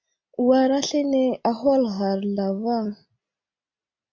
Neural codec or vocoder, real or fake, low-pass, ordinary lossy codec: none; real; 7.2 kHz; MP3, 48 kbps